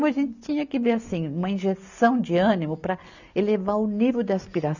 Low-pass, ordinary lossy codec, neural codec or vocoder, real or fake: 7.2 kHz; none; none; real